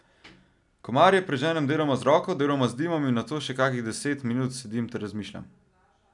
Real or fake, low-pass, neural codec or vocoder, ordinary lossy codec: real; 10.8 kHz; none; none